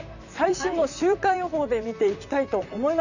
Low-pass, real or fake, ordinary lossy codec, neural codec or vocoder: 7.2 kHz; fake; none; vocoder, 44.1 kHz, 128 mel bands, Pupu-Vocoder